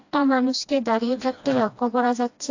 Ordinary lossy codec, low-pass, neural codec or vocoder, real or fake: AAC, 48 kbps; 7.2 kHz; codec, 16 kHz, 1 kbps, FreqCodec, smaller model; fake